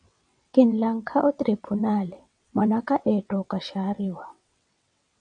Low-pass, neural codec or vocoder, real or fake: 9.9 kHz; vocoder, 22.05 kHz, 80 mel bands, WaveNeXt; fake